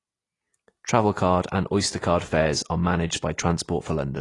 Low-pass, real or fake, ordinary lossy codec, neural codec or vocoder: 10.8 kHz; real; AAC, 32 kbps; none